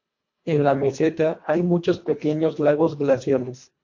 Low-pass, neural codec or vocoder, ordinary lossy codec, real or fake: 7.2 kHz; codec, 24 kHz, 1.5 kbps, HILCodec; MP3, 48 kbps; fake